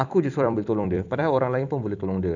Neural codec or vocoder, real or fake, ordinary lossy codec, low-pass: vocoder, 44.1 kHz, 128 mel bands every 256 samples, BigVGAN v2; fake; none; 7.2 kHz